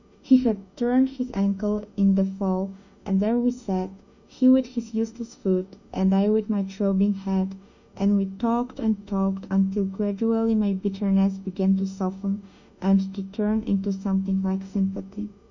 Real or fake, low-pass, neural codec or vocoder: fake; 7.2 kHz; autoencoder, 48 kHz, 32 numbers a frame, DAC-VAE, trained on Japanese speech